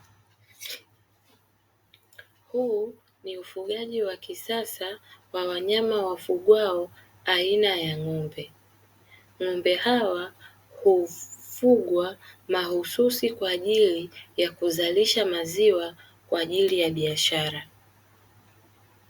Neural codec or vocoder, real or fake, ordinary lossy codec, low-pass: none; real; Opus, 64 kbps; 19.8 kHz